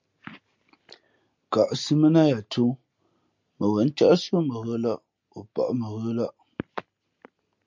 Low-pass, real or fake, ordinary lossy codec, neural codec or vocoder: 7.2 kHz; real; MP3, 64 kbps; none